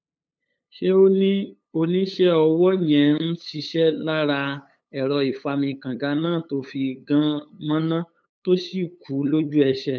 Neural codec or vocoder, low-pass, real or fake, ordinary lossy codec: codec, 16 kHz, 8 kbps, FunCodec, trained on LibriTTS, 25 frames a second; none; fake; none